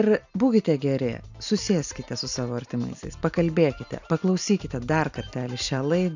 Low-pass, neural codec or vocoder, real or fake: 7.2 kHz; none; real